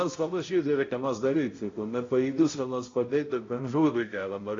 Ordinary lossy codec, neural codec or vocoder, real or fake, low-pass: AAC, 32 kbps; codec, 16 kHz, 0.5 kbps, X-Codec, HuBERT features, trained on balanced general audio; fake; 7.2 kHz